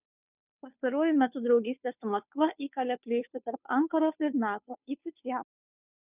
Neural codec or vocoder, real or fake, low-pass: codec, 16 kHz, 2 kbps, FunCodec, trained on Chinese and English, 25 frames a second; fake; 3.6 kHz